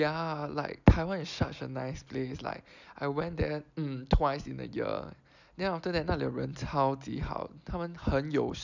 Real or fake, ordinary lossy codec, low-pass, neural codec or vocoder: real; none; 7.2 kHz; none